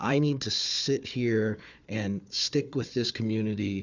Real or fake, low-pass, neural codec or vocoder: fake; 7.2 kHz; codec, 16 kHz, 4 kbps, FunCodec, trained on Chinese and English, 50 frames a second